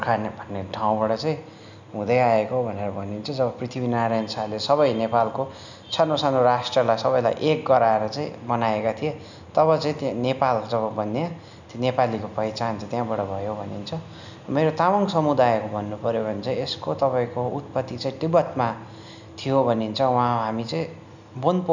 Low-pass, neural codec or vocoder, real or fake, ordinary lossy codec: 7.2 kHz; none; real; none